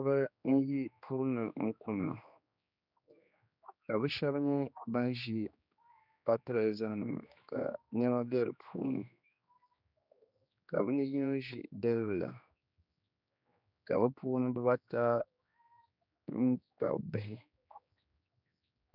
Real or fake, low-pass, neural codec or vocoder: fake; 5.4 kHz; codec, 16 kHz, 2 kbps, X-Codec, HuBERT features, trained on general audio